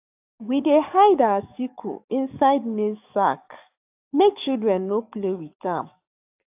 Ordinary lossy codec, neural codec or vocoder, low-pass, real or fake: AAC, 32 kbps; codec, 44.1 kHz, 7.8 kbps, DAC; 3.6 kHz; fake